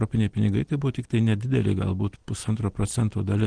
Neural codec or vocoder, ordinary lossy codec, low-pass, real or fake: none; Opus, 16 kbps; 9.9 kHz; real